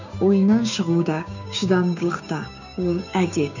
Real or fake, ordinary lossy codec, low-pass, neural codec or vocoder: fake; none; 7.2 kHz; codec, 16 kHz, 6 kbps, DAC